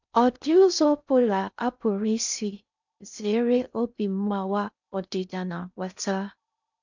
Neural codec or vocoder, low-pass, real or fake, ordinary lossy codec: codec, 16 kHz in and 24 kHz out, 0.6 kbps, FocalCodec, streaming, 2048 codes; 7.2 kHz; fake; none